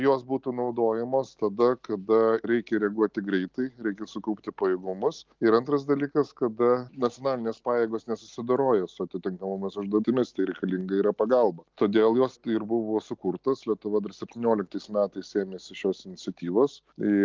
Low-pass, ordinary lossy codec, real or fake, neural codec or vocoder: 7.2 kHz; Opus, 32 kbps; real; none